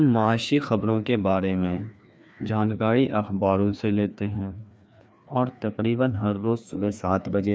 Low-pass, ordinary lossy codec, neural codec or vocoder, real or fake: none; none; codec, 16 kHz, 2 kbps, FreqCodec, larger model; fake